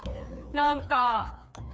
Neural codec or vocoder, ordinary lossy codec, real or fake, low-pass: codec, 16 kHz, 2 kbps, FreqCodec, larger model; none; fake; none